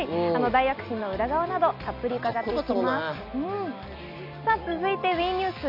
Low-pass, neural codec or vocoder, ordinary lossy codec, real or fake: 5.4 kHz; none; none; real